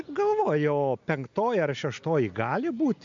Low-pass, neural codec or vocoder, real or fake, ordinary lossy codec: 7.2 kHz; none; real; MP3, 64 kbps